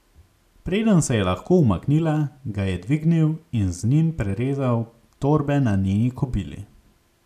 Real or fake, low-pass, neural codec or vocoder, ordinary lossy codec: real; 14.4 kHz; none; none